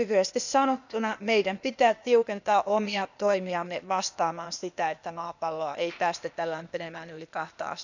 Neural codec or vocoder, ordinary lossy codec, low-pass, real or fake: codec, 16 kHz, 0.8 kbps, ZipCodec; none; 7.2 kHz; fake